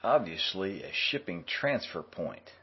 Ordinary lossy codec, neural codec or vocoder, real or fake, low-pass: MP3, 24 kbps; none; real; 7.2 kHz